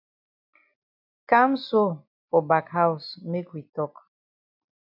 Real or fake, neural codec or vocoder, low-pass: real; none; 5.4 kHz